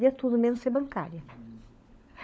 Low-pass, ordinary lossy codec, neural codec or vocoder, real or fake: none; none; codec, 16 kHz, 16 kbps, FunCodec, trained on LibriTTS, 50 frames a second; fake